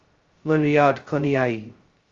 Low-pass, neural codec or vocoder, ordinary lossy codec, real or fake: 7.2 kHz; codec, 16 kHz, 0.2 kbps, FocalCodec; Opus, 32 kbps; fake